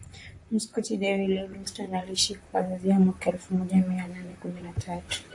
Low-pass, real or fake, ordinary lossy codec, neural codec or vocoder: 10.8 kHz; fake; AAC, 64 kbps; vocoder, 44.1 kHz, 128 mel bands, Pupu-Vocoder